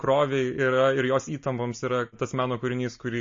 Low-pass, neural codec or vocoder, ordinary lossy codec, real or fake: 7.2 kHz; none; MP3, 32 kbps; real